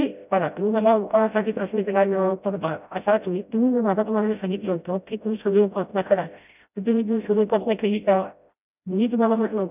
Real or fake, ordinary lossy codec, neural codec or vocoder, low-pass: fake; none; codec, 16 kHz, 0.5 kbps, FreqCodec, smaller model; 3.6 kHz